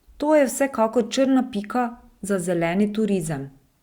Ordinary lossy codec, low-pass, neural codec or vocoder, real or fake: Opus, 64 kbps; 19.8 kHz; none; real